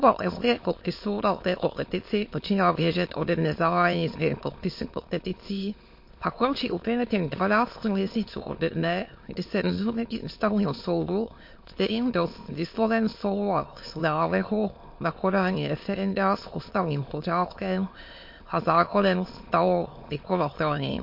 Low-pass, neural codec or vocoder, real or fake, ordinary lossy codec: 5.4 kHz; autoencoder, 22.05 kHz, a latent of 192 numbers a frame, VITS, trained on many speakers; fake; MP3, 32 kbps